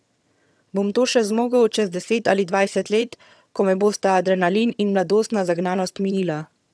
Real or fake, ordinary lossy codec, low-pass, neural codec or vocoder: fake; none; none; vocoder, 22.05 kHz, 80 mel bands, HiFi-GAN